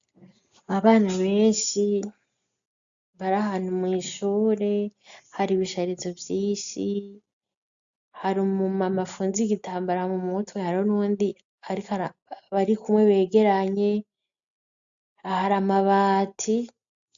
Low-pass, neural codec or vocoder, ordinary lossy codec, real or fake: 7.2 kHz; none; AAC, 64 kbps; real